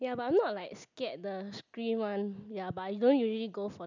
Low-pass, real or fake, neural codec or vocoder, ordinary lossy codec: 7.2 kHz; real; none; none